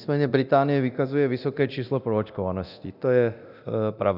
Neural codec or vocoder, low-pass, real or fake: codec, 24 kHz, 0.9 kbps, DualCodec; 5.4 kHz; fake